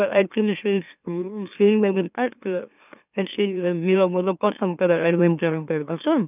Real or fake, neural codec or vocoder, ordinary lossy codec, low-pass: fake; autoencoder, 44.1 kHz, a latent of 192 numbers a frame, MeloTTS; none; 3.6 kHz